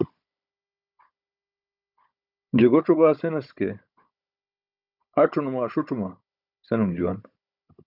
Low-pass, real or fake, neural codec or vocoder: 5.4 kHz; fake; codec, 16 kHz, 16 kbps, FunCodec, trained on Chinese and English, 50 frames a second